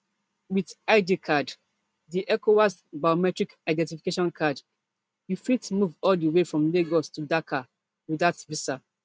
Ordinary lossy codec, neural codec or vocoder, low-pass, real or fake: none; none; none; real